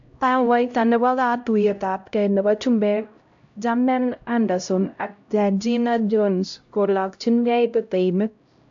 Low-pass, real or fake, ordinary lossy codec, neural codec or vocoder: 7.2 kHz; fake; AAC, 64 kbps; codec, 16 kHz, 0.5 kbps, X-Codec, HuBERT features, trained on LibriSpeech